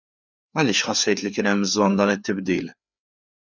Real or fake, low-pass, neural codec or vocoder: fake; 7.2 kHz; codec, 16 kHz, 4 kbps, FreqCodec, larger model